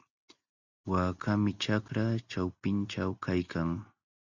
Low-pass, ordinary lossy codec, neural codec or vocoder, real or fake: 7.2 kHz; Opus, 64 kbps; none; real